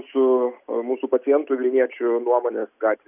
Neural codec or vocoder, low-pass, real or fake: none; 3.6 kHz; real